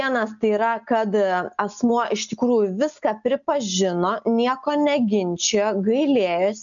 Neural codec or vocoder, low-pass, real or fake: none; 7.2 kHz; real